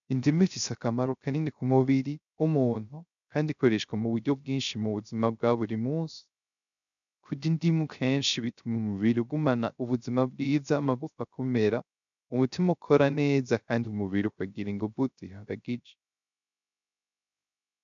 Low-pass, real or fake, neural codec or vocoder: 7.2 kHz; fake; codec, 16 kHz, 0.3 kbps, FocalCodec